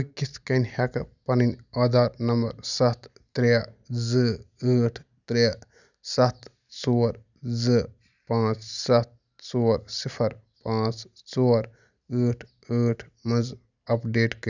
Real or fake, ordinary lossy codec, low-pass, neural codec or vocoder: real; none; 7.2 kHz; none